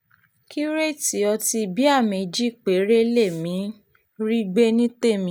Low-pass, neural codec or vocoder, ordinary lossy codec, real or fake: 19.8 kHz; none; none; real